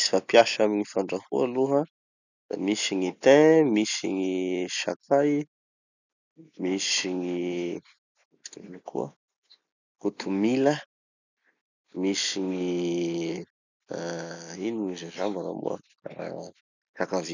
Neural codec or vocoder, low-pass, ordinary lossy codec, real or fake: none; 7.2 kHz; none; real